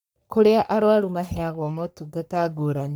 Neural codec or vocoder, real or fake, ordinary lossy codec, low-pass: codec, 44.1 kHz, 3.4 kbps, Pupu-Codec; fake; none; none